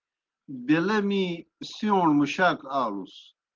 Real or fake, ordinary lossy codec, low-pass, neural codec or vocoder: real; Opus, 16 kbps; 7.2 kHz; none